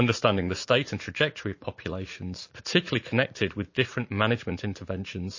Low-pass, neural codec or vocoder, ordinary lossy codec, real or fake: 7.2 kHz; vocoder, 44.1 kHz, 80 mel bands, Vocos; MP3, 32 kbps; fake